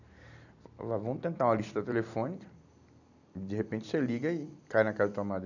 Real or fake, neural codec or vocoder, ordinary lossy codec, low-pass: fake; vocoder, 44.1 kHz, 128 mel bands every 256 samples, BigVGAN v2; none; 7.2 kHz